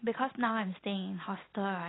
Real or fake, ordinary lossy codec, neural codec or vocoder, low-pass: real; AAC, 16 kbps; none; 7.2 kHz